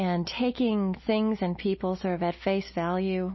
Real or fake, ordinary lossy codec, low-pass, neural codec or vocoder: real; MP3, 24 kbps; 7.2 kHz; none